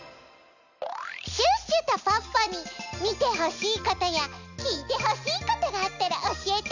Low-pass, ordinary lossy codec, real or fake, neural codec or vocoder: 7.2 kHz; none; real; none